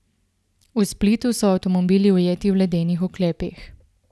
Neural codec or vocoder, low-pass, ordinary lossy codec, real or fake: none; none; none; real